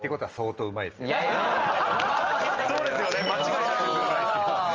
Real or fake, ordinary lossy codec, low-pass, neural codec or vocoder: real; Opus, 24 kbps; 7.2 kHz; none